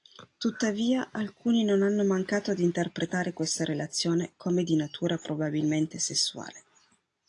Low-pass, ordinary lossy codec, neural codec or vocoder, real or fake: 10.8 kHz; AAC, 48 kbps; none; real